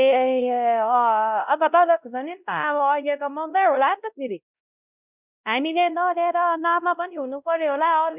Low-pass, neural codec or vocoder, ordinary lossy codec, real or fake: 3.6 kHz; codec, 16 kHz, 0.5 kbps, X-Codec, WavLM features, trained on Multilingual LibriSpeech; none; fake